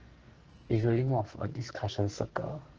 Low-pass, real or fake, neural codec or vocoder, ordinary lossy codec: 7.2 kHz; fake; codec, 44.1 kHz, 2.6 kbps, SNAC; Opus, 16 kbps